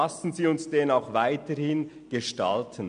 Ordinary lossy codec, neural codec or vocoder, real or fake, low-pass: MP3, 96 kbps; none; real; 9.9 kHz